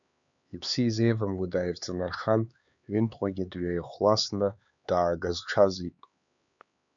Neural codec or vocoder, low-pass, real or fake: codec, 16 kHz, 4 kbps, X-Codec, HuBERT features, trained on LibriSpeech; 7.2 kHz; fake